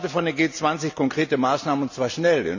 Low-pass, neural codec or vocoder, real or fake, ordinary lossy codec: 7.2 kHz; none; real; none